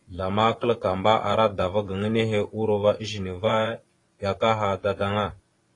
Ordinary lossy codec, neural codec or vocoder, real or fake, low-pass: AAC, 32 kbps; vocoder, 24 kHz, 100 mel bands, Vocos; fake; 10.8 kHz